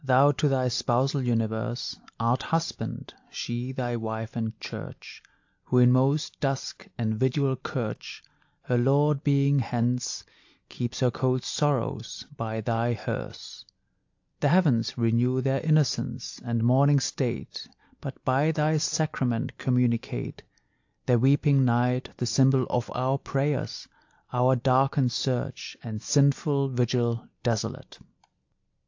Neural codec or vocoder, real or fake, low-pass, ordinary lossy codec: none; real; 7.2 kHz; AAC, 48 kbps